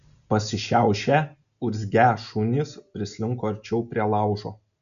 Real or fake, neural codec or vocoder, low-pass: real; none; 7.2 kHz